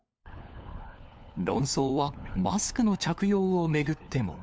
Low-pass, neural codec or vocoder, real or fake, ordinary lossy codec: none; codec, 16 kHz, 4 kbps, FunCodec, trained on LibriTTS, 50 frames a second; fake; none